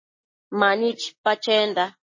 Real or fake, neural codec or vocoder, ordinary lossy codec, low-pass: real; none; MP3, 32 kbps; 7.2 kHz